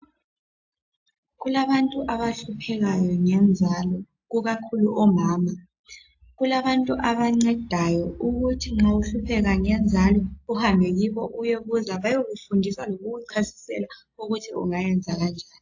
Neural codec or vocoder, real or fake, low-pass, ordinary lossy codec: none; real; 7.2 kHz; AAC, 48 kbps